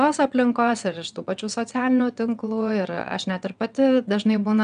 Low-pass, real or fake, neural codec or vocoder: 9.9 kHz; fake; vocoder, 22.05 kHz, 80 mel bands, WaveNeXt